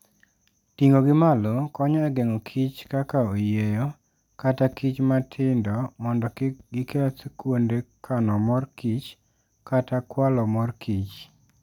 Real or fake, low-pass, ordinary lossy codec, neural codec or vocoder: real; 19.8 kHz; none; none